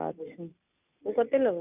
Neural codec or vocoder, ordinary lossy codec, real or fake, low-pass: none; none; real; 3.6 kHz